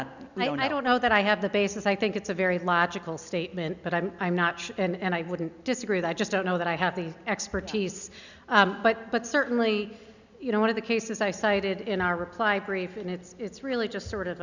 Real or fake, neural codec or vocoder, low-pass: real; none; 7.2 kHz